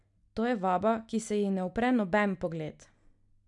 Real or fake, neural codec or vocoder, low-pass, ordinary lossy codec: real; none; 10.8 kHz; none